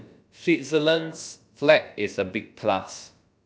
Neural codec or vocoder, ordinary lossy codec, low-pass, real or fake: codec, 16 kHz, about 1 kbps, DyCAST, with the encoder's durations; none; none; fake